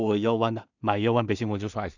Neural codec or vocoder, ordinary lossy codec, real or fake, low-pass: codec, 16 kHz in and 24 kHz out, 0.4 kbps, LongCat-Audio-Codec, two codebook decoder; none; fake; 7.2 kHz